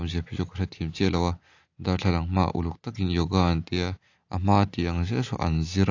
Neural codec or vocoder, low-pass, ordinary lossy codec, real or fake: none; 7.2 kHz; AAC, 48 kbps; real